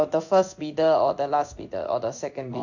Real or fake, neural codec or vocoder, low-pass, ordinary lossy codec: fake; codec, 16 kHz in and 24 kHz out, 1 kbps, XY-Tokenizer; 7.2 kHz; none